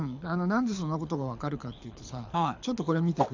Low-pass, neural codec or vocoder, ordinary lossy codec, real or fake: 7.2 kHz; codec, 24 kHz, 6 kbps, HILCodec; AAC, 48 kbps; fake